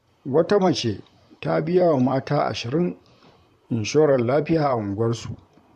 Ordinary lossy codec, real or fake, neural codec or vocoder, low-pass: MP3, 64 kbps; fake; vocoder, 44.1 kHz, 128 mel bands, Pupu-Vocoder; 14.4 kHz